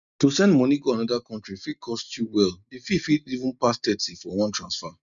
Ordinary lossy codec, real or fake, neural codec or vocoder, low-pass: none; real; none; 7.2 kHz